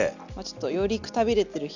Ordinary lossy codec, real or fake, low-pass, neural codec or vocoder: none; real; 7.2 kHz; none